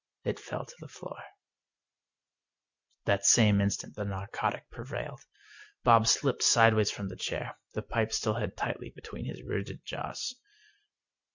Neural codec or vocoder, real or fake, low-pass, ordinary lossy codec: none; real; 7.2 kHz; Opus, 64 kbps